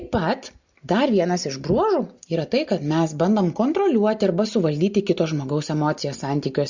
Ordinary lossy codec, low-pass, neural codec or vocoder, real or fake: Opus, 64 kbps; 7.2 kHz; none; real